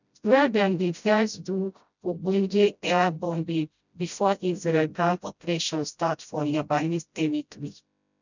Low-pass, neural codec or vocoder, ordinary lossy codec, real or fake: 7.2 kHz; codec, 16 kHz, 0.5 kbps, FreqCodec, smaller model; none; fake